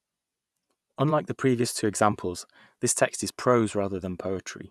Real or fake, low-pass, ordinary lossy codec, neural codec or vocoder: fake; none; none; vocoder, 24 kHz, 100 mel bands, Vocos